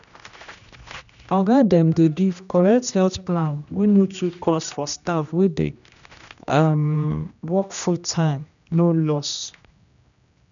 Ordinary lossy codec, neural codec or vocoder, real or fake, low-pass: none; codec, 16 kHz, 1 kbps, X-Codec, HuBERT features, trained on general audio; fake; 7.2 kHz